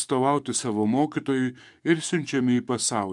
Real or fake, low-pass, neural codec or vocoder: fake; 10.8 kHz; codec, 44.1 kHz, 7.8 kbps, DAC